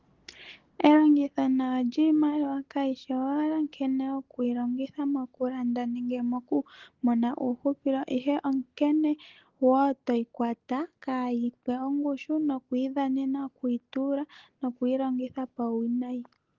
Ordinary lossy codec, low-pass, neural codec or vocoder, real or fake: Opus, 16 kbps; 7.2 kHz; none; real